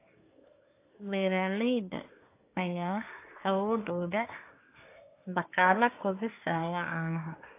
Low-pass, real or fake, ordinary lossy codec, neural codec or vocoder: 3.6 kHz; fake; AAC, 24 kbps; codec, 24 kHz, 1 kbps, SNAC